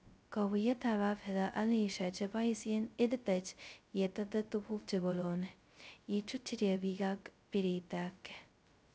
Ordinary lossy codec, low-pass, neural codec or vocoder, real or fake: none; none; codec, 16 kHz, 0.2 kbps, FocalCodec; fake